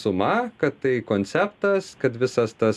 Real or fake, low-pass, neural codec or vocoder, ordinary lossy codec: real; 14.4 kHz; none; MP3, 96 kbps